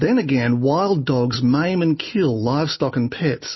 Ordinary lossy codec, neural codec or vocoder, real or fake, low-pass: MP3, 24 kbps; none; real; 7.2 kHz